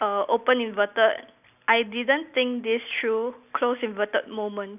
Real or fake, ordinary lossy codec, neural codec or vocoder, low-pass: real; none; none; 3.6 kHz